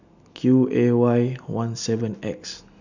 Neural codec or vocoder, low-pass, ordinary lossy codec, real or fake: none; 7.2 kHz; none; real